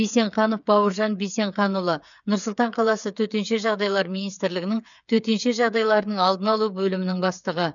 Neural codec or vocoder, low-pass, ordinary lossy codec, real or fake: codec, 16 kHz, 8 kbps, FreqCodec, smaller model; 7.2 kHz; none; fake